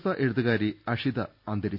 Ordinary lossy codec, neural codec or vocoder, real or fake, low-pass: none; none; real; 5.4 kHz